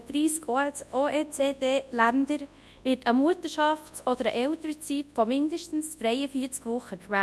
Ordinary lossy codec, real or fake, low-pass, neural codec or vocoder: none; fake; none; codec, 24 kHz, 0.9 kbps, WavTokenizer, large speech release